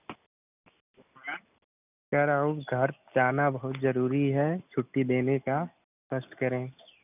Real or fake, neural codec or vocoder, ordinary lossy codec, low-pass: real; none; none; 3.6 kHz